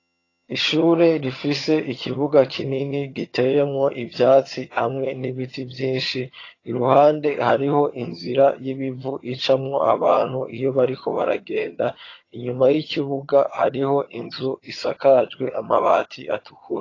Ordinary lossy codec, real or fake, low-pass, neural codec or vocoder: AAC, 32 kbps; fake; 7.2 kHz; vocoder, 22.05 kHz, 80 mel bands, HiFi-GAN